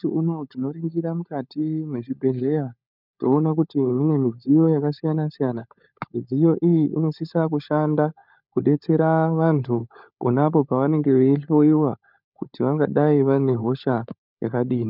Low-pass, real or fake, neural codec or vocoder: 5.4 kHz; fake; codec, 16 kHz, 16 kbps, FunCodec, trained on LibriTTS, 50 frames a second